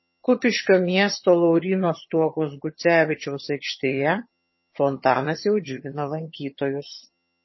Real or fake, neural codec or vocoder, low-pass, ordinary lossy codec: fake; vocoder, 22.05 kHz, 80 mel bands, HiFi-GAN; 7.2 kHz; MP3, 24 kbps